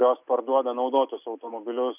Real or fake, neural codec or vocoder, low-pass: real; none; 3.6 kHz